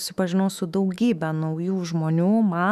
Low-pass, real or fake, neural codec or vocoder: 14.4 kHz; fake; autoencoder, 48 kHz, 128 numbers a frame, DAC-VAE, trained on Japanese speech